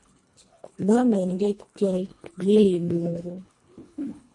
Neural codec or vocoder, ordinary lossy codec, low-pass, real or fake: codec, 24 kHz, 1.5 kbps, HILCodec; MP3, 48 kbps; 10.8 kHz; fake